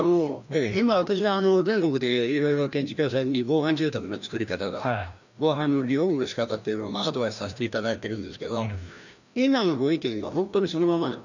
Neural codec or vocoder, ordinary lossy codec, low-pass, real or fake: codec, 16 kHz, 1 kbps, FreqCodec, larger model; none; 7.2 kHz; fake